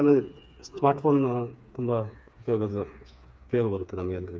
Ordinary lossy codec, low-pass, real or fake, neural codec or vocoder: none; none; fake; codec, 16 kHz, 4 kbps, FreqCodec, smaller model